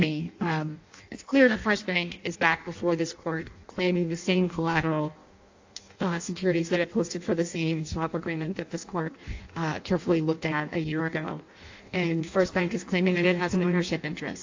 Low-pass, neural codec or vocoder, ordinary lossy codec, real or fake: 7.2 kHz; codec, 16 kHz in and 24 kHz out, 0.6 kbps, FireRedTTS-2 codec; AAC, 48 kbps; fake